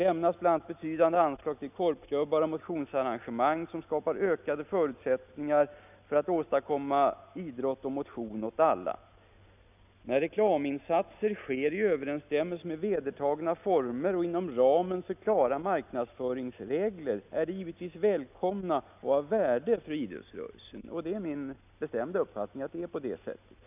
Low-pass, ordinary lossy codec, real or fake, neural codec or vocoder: 3.6 kHz; none; real; none